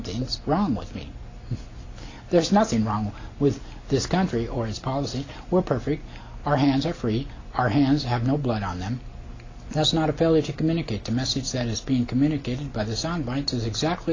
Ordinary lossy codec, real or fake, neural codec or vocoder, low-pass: AAC, 32 kbps; real; none; 7.2 kHz